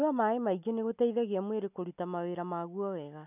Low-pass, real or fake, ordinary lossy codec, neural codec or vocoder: 3.6 kHz; real; none; none